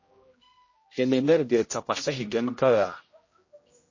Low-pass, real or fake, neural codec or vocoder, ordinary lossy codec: 7.2 kHz; fake; codec, 16 kHz, 0.5 kbps, X-Codec, HuBERT features, trained on general audio; MP3, 32 kbps